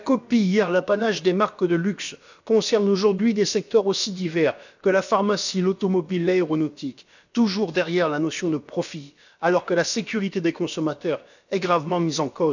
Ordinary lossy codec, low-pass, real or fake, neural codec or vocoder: none; 7.2 kHz; fake; codec, 16 kHz, about 1 kbps, DyCAST, with the encoder's durations